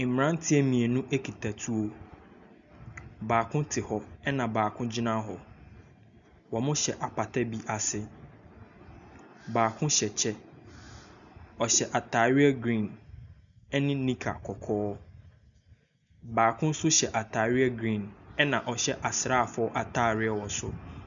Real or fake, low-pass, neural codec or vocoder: real; 7.2 kHz; none